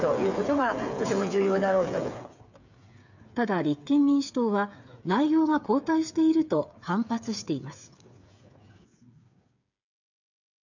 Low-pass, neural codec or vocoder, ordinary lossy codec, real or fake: 7.2 kHz; codec, 16 kHz, 8 kbps, FreqCodec, smaller model; none; fake